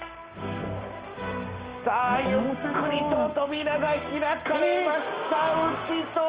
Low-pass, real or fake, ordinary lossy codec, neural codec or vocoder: 3.6 kHz; fake; Opus, 24 kbps; codec, 16 kHz, 6 kbps, DAC